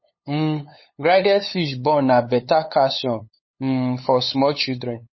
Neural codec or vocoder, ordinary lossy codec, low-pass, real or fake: codec, 16 kHz, 8 kbps, FunCodec, trained on LibriTTS, 25 frames a second; MP3, 24 kbps; 7.2 kHz; fake